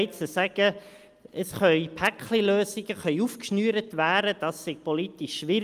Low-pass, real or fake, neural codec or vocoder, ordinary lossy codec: 14.4 kHz; real; none; Opus, 32 kbps